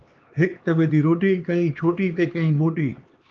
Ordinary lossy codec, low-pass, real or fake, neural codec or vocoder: Opus, 24 kbps; 7.2 kHz; fake; codec, 16 kHz, 2 kbps, X-Codec, HuBERT features, trained on LibriSpeech